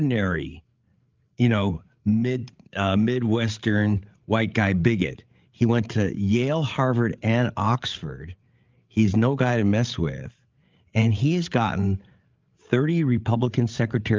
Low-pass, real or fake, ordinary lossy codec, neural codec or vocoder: 7.2 kHz; fake; Opus, 32 kbps; codec, 16 kHz, 8 kbps, FreqCodec, larger model